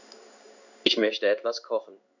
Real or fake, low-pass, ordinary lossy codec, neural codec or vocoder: fake; 7.2 kHz; none; codec, 16 kHz in and 24 kHz out, 1 kbps, XY-Tokenizer